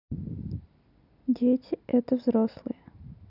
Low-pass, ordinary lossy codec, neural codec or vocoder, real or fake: 5.4 kHz; none; none; real